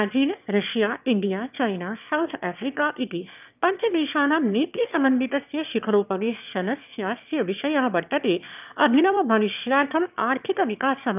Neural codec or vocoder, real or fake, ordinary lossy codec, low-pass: autoencoder, 22.05 kHz, a latent of 192 numbers a frame, VITS, trained on one speaker; fake; none; 3.6 kHz